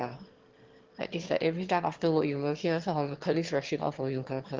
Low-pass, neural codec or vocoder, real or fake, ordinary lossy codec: 7.2 kHz; autoencoder, 22.05 kHz, a latent of 192 numbers a frame, VITS, trained on one speaker; fake; Opus, 16 kbps